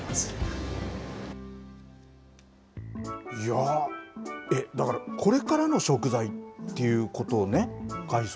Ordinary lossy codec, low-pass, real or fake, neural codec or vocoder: none; none; real; none